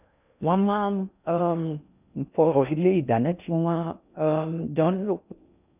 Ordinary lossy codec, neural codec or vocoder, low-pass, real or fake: none; codec, 16 kHz in and 24 kHz out, 0.6 kbps, FocalCodec, streaming, 2048 codes; 3.6 kHz; fake